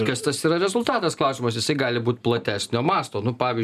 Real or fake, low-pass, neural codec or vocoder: real; 14.4 kHz; none